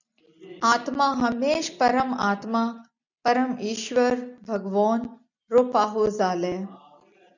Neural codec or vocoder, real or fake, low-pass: none; real; 7.2 kHz